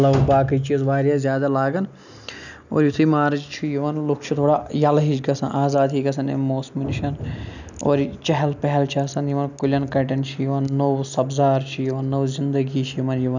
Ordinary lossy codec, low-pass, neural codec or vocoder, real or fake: none; 7.2 kHz; none; real